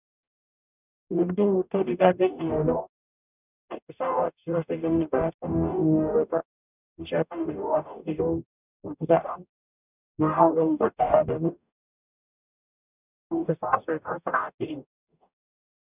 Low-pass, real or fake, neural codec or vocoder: 3.6 kHz; fake; codec, 44.1 kHz, 0.9 kbps, DAC